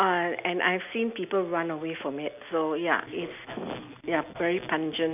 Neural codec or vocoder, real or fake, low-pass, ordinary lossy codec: none; real; 3.6 kHz; none